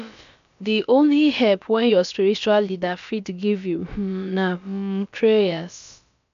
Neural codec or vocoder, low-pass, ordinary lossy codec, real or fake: codec, 16 kHz, about 1 kbps, DyCAST, with the encoder's durations; 7.2 kHz; MP3, 64 kbps; fake